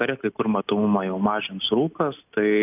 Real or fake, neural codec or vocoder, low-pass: real; none; 3.6 kHz